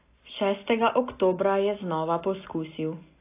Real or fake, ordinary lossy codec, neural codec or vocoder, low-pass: real; AAC, 24 kbps; none; 3.6 kHz